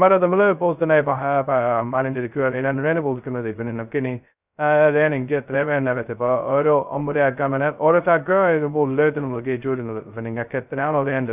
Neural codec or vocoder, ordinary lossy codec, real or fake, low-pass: codec, 16 kHz, 0.2 kbps, FocalCodec; none; fake; 3.6 kHz